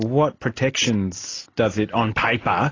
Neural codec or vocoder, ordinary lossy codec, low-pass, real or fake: none; AAC, 32 kbps; 7.2 kHz; real